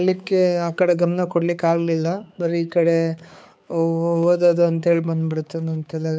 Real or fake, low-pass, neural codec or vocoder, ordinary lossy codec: fake; none; codec, 16 kHz, 4 kbps, X-Codec, HuBERT features, trained on balanced general audio; none